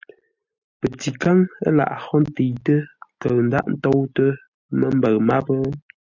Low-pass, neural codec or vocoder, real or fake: 7.2 kHz; none; real